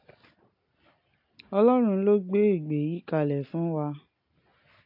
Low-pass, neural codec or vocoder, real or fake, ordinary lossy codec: 5.4 kHz; none; real; AAC, 48 kbps